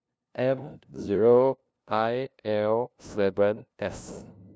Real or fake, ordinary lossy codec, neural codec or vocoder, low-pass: fake; none; codec, 16 kHz, 0.5 kbps, FunCodec, trained on LibriTTS, 25 frames a second; none